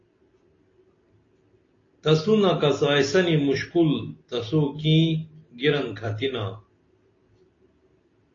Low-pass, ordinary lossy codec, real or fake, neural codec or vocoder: 7.2 kHz; AAC, 32 kbps; real; none